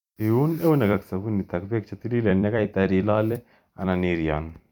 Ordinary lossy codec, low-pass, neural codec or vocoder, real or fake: none; 19.8 kHz; vocoder, 44.1 kHz, 128 mel bands every 256 samples, BigVGAN v2; fake